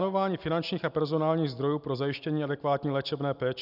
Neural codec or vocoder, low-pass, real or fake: none; 5.4 kHz; real